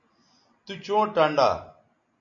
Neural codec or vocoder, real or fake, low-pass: none; real; 7.2 kHz